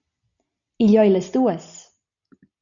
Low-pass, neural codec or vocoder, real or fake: 7.2 kHz; none; real